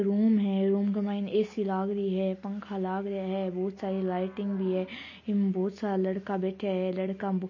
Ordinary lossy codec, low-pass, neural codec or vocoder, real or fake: MP3, 32 kbps; 7.2 kHz; none; real